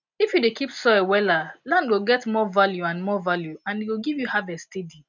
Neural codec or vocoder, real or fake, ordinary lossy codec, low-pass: none; real; none; 7.2 kHz